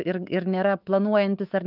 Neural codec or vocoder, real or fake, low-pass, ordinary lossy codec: autoencoder, 48 kHz, 128 numbers a frame, DAC-VAE, trained on Japanese speech; fake; 5.4 kHz; Opus, 24 kbps